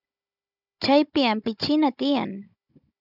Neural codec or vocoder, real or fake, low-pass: codec, 16 kHz, 16 kbps, FunCodec, trained on Chinese and English, 50 frames a second; fake; 5.4 kHz